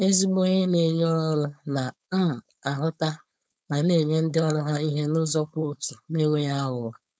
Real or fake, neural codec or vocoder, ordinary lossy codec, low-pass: fake; codec, 16 kHz, 4.8 kbps, FACodec; none; none